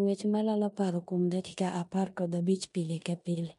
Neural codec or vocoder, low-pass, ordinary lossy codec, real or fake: codec, 16 kHz in and 24 kHz out, 0.9 kbps, LongCat-Audio-Codec, four codebook decoder; 10.8 kHz; MP3, 64 kbps; fake